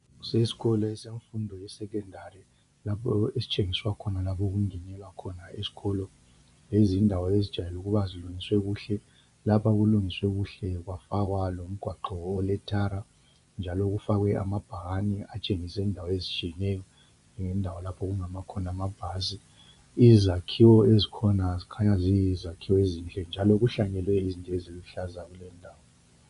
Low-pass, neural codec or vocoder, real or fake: 10.8 kHz; vocoder, 24 kHz, 100 mel bands, Vocos; fake